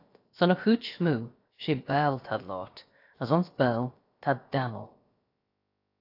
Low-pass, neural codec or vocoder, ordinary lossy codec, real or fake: 5.4 kHz; codec, 16 kHz, about 1 kbps, DyCAST, with the encoder's durations; AAC, 32 kbps; fake